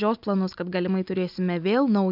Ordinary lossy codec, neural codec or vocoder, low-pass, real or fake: AAC, 48 kbps; none; 5.4 kHz; real